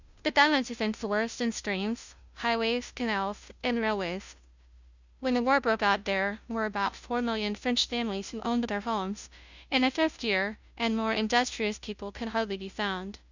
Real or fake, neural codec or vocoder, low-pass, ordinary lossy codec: fake; codec, 16 kHz, 0.5 kbps, FunCodec, trained on Chinese and English, 25 frames a second; 7.2 kHz; Opus, 64 kbps